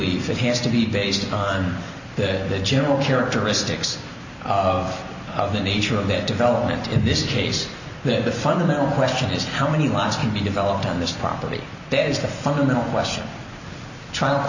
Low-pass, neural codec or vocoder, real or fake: 7.2 kHz; none; real